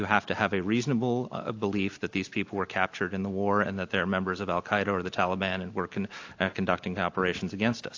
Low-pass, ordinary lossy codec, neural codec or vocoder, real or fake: 7.2 kHz; AAC, 48 kbps; none; real